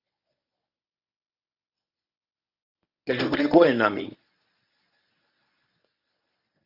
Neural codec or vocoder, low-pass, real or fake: codec, 24 kHz, 0.9 kbps, WavTokenizer, medium speech release version 1; 5.4 kHz; fake